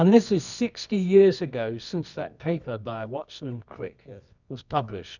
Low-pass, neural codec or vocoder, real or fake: 7.2 kHz; codec, 24 kHz, 0.9 kbps, WavTokenizer, medium music audio release; fake